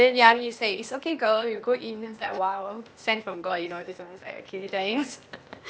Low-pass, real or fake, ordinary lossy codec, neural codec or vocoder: none; fake; none; codec, 16 kHz, 0.8 kbps, ZipCodec